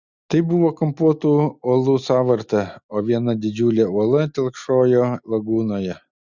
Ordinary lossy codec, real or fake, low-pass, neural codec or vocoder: Opus, 64 kbps; real; 7.2 kHz; none